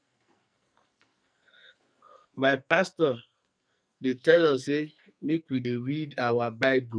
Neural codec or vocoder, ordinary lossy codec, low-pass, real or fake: codec, 44.1 kHz, 2.6 kbps, SNAC; none; 9.9 kHz; fake